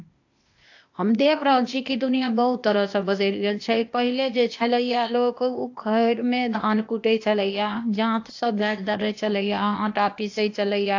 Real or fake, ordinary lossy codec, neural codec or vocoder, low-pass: fake; AAC, 48 kbps; codec, 16 kHz, 0.8 kbps, ZipCodec; 7.2 kHz